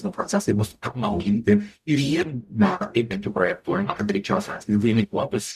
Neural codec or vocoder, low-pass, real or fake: codec, 44.1 kHz, 0.9 kbps, DAC; 14.4 kHz; fake